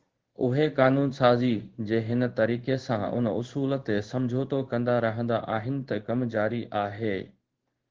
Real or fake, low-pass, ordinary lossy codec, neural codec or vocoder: fake; 7.2 kHz; Opus, 16 kbps; codec, 16 kHz in and 24 kHz out, 1 kbps, XY-Tokenizer